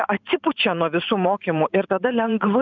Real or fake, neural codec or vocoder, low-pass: fake; vocoder, 44.1 kHz, 128 mel bands every 512 samples, BigVGAN v2; 7.2 kHz